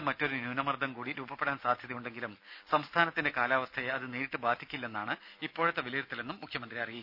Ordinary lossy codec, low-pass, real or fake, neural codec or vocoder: none; 5.4 kHz; real; none